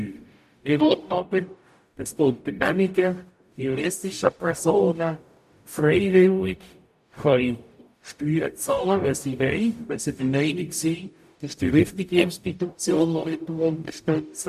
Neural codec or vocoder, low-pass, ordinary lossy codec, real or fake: codec, 44.1 kHz, 0.9 kbps, DAC; 14.4 kHz; none; fake